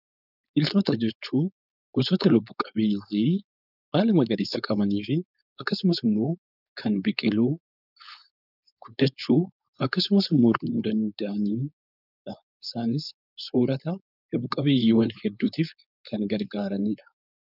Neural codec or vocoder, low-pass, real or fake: codec, 16 kHz, 4.8 kbps, FACodec; 5.4 kHz; fake